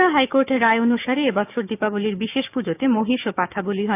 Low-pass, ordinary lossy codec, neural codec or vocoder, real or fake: 3.6 kHz; none; codec, 16 kHz, 6 kbps, DAC; fake